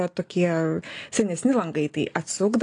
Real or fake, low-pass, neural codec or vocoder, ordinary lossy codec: real; 9.9 kHz; none; AAC, 48 kbps